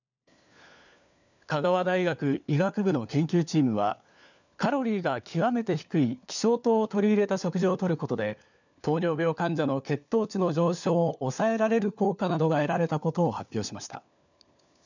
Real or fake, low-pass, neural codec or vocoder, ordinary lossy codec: fake; 7.2 kHz; codec, 16 kHz, 4 kbps, FunCodec, trained on LibriTTS, 50 frames a second; none